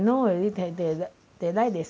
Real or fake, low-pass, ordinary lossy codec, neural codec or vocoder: real; none; none; none